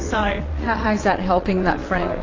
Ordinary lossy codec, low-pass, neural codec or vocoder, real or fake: AAC, 32 kbps; 7.2 kHz; vocoder, 22.05 kHz, 80 mel bands, WaveNeXt; fake